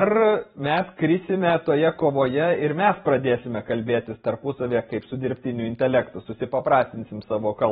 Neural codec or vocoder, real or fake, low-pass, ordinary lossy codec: vocoder, 44.1 kHz, 128 mel bands every 512 samples, BigVGAN v2; fake; 19.8 kHz; AAC, 16 kbps